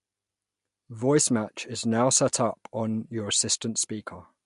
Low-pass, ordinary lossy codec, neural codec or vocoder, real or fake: 10.8 kHz; MP3, 64 kbps; none; real